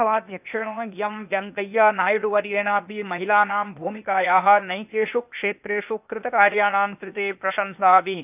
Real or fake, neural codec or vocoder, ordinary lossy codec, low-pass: fake; codec, 16 kHz, 0.8 kbps, ZipCodec; none; 3.6 kHz